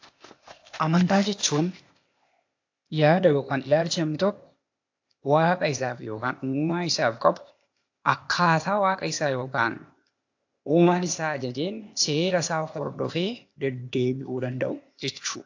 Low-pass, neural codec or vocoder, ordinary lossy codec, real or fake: 7.2 kHz; codec, 16 kHz, 0.8 kbps, ZipCodec; AAC, 48 kbps; fake